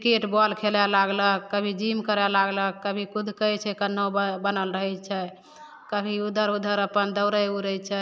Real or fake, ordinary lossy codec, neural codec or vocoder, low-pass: real; none; none; none